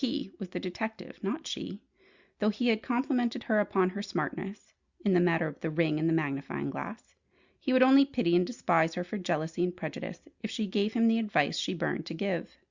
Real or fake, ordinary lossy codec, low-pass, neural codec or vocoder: real; Opus, 64 kbps; 7.2 kHz; none